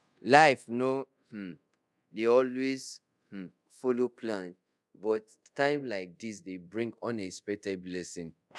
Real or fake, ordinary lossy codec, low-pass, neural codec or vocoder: fake; none; none; codec, 24 kHz, 0.5 kbps, DualCodec